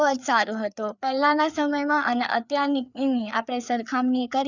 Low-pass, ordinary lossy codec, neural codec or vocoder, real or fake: 7.2 kHz; none; codec, 16 kHz, 4 kbps, FreqCodec, larger model; fake